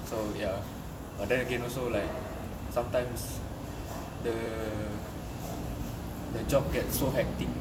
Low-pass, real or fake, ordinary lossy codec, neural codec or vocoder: 19.8 kHz; fake; none; vocoder, 44.1 kHz, 128 mel bands every 256 samples, BigVGAN v2